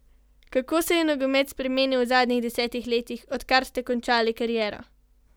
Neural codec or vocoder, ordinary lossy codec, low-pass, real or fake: none; none; none; real